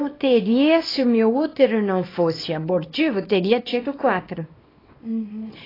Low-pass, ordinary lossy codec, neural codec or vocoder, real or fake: 5.4 kHz; AAC, 24 kbps; codec, 24 kHz, 0.9 kbps, WavTokenizer, small release; fake